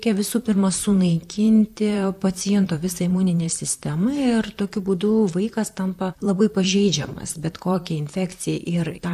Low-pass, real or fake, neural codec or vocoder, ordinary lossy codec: 14.4 kHz; fake; vocoder, 44.1 kHz, 128 mel bands, Pupu-Vocoder; AAC, 96 kbps